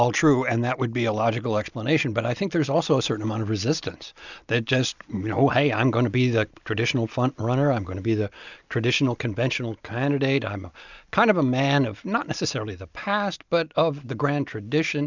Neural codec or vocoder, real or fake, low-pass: none; real; 7.2 kHz